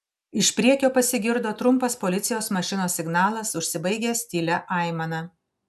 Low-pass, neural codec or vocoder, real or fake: 14.4 kHz; none; real